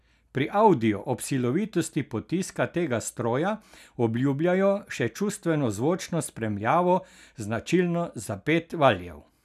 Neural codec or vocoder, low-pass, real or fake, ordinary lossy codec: none; 14.4 kHz; real; none